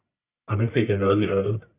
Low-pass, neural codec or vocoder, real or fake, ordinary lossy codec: 3.6 kHz; codec, 44.1 kHz, 1.7 kbps, Pupu-Codec; fake; Opus, 64 kbps